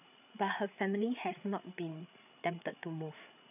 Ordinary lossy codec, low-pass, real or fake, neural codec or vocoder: none; 3.6 kHz; fake; codec, 16 kHz, 8 kbps, FreqCodec, larger model